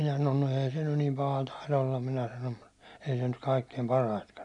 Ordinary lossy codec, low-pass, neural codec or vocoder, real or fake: none; 10.8 kHz; none; real